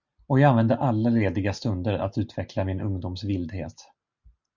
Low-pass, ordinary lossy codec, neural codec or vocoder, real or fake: 7.2 kHz; Opus, 64 kbps; none; real